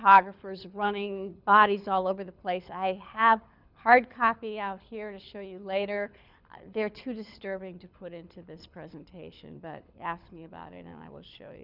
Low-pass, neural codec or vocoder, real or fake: 5.4 kHz; codec, 24 kHz, 6 kbps, HILCodec; fake